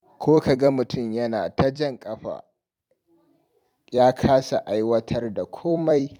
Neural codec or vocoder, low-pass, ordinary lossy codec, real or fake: vocoder, 44.1 kHz, 128 mel bands every 256 samples, BigVGAN v2; 19.8 kHz; none; fake